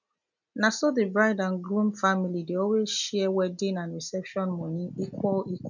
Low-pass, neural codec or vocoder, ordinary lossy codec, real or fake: 7.2 kHz; none; none; real